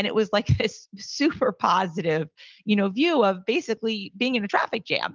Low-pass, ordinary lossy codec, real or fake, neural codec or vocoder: 7.2 kHz; Opus, 24 kbps; real; none